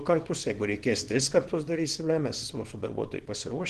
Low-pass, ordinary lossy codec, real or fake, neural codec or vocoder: 10.8 kHz; Opus, 16 kbps; fake; codec, 24 kHz, 0.9 kbps, WavTokenizer, small release